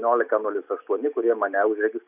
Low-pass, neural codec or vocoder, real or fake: 3.6 kHz; none; real